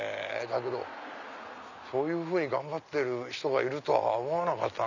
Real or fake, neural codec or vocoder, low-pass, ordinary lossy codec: real; none; 7.2 kHz; none